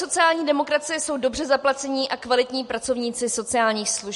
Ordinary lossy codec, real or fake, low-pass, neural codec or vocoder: MP3, 48 kbps; real; 14.4 kHz; none